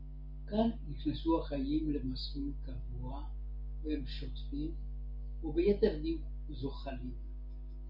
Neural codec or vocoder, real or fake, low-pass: none; real; 5.4 kHz